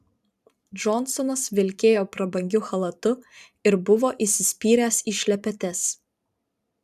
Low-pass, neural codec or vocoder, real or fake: 14.4 kHz; vocoder, 44.1 kHz, 128 mel bands every 512 samples, BigVGAN v2; fake